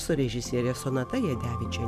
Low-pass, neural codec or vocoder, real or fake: 14.4 kHz; none; real